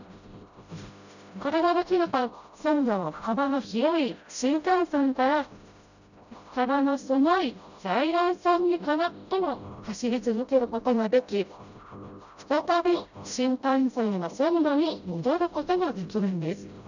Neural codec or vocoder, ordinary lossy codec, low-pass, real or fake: codec, 16 kHz, 0.5 kbps, FreqCodec, smaller model; none; 7.2 kHz; fake